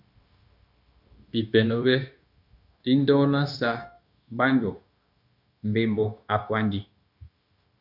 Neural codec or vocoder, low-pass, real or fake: codec, 16 kHz, 0.9 kbps, LongCat-Audio-Codec; 5.4 kHz; fake